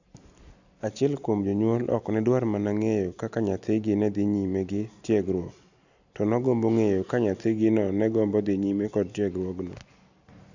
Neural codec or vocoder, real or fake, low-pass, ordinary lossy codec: none; real; 7.2 kHz; none